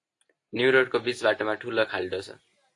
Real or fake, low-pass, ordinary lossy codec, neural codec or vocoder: real; 10.8 kHz; AAC, 48 kbps; none